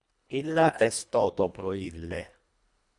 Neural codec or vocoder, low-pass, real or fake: codec, 24 kHz, 1.5 kbps, HILCodec; 10.8 kHz; fake